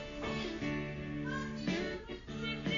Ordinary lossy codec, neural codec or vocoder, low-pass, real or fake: AAC, 48 kbps; none; 7.2 kHz; real